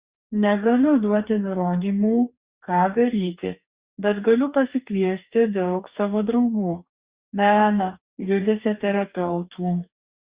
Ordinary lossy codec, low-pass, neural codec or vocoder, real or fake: Opus, 64 kbps; 3.6 kHz; codec, 44.1 kHz, 2.6 kbps, DAC; fake